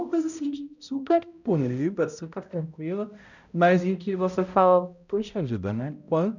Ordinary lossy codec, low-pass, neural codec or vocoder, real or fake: none; 7.2 kHz; codec, 16 kHz, 0.5 kbps, X-Codec, HuBERT features, trained on balanced general audio; fake